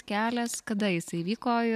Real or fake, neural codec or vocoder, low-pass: real; none; 14.4 kHz